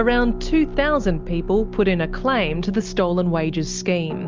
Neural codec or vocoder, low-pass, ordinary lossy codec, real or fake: none; 7.2 kHz; Opus, 24 kbps; real